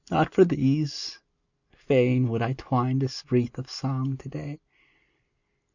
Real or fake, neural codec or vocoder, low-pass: real; none; 7.2 kHz